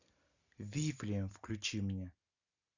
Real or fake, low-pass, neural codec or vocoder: real; 7.2 kHz; none